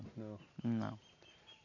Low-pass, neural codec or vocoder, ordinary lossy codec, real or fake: 7.2 kHz; none; none; real